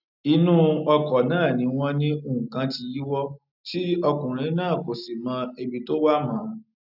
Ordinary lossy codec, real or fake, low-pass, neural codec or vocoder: none; real; 5.4 kHz; none